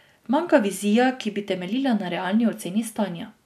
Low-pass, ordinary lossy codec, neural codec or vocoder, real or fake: 14.4 kHz; none; none; real